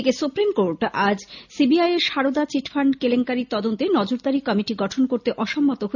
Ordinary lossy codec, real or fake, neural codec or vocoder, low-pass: none; real; none; 7.2 kHz